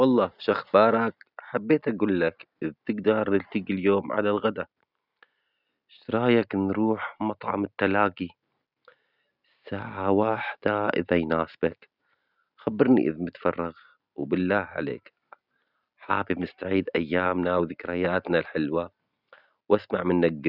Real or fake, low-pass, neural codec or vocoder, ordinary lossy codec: real; 5.4 kHz; none; none